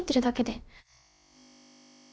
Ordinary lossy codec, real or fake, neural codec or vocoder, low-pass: none; fake; codec, 16 kHz, about 1 kbps, DyCAST, with the encoder's durations; none